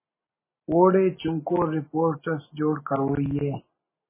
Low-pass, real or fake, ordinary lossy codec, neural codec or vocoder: 3.6 kHz; real; MP3, 16 kbps; none